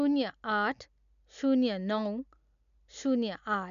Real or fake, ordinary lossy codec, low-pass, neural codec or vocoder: real; none; 7.2 kHz; none